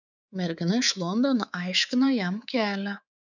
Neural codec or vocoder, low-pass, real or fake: codec, 24 kHz, 3.1 kbps, DualCodec; 7.2 kHz; fake